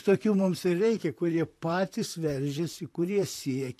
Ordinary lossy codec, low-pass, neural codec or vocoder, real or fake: AAC, 64 kbps; 14.4 kHz; vocoder, 44.1 kHz, 128 mel bands, Pupu-Vocoder; fake